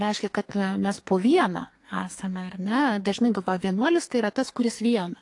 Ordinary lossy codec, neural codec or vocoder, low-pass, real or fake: AAC, 48 kbps; codec, 44.1 kHz, 2.6 kbps, SNAC; 10.8 kHz; fake